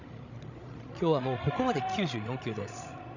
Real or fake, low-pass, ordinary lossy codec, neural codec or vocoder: fake; 7.2 kHz; none; codec, 16 kHz, 16 kbps, FreqCodec, larger model